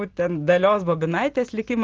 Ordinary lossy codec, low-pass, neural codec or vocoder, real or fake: Opus, 32 kbps; 7.2 kHz; none; real